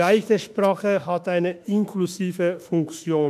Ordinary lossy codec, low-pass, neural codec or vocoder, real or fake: MP3, 96 kbps; 14.4 kHz; autoencoder, 48 kHz, 32 numbers a frame, DAC-VAE, trained on Japanese speech; fake